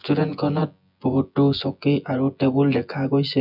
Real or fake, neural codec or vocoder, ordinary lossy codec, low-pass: fake; vocoder, 24 kHz, 100 mel bands, Vocos; none; 5.4 kHz